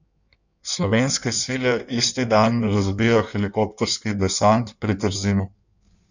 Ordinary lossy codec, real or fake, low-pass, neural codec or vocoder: none; fake; 7.2 kHz; codec, 16 kHz in and 24 kHz out, 1.1 kbps, FireRedTTS-2 codec